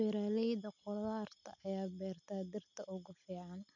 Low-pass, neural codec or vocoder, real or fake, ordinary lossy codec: 7.2 kHz; none; real; none